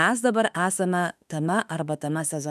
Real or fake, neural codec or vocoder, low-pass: fake; autoencoder, 48 kHz, 32 numbers a frame, DAC-VAE, trained on Japanese speech; 14.4 kHz